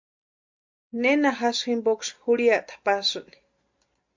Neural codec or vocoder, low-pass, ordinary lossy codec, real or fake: none; 7.2 kHz; MP3, 64 kbps; real